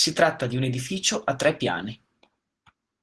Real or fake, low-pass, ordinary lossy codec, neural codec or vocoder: real; 10.8 kHz; Opus, 16 kbps; none